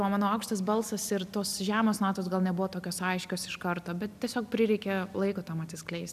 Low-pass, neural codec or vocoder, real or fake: 14.4 kHz; none; real